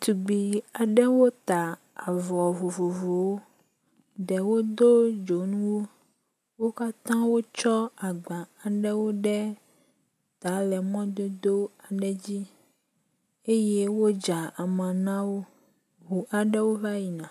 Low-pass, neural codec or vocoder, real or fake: 14.4 kHz; none; real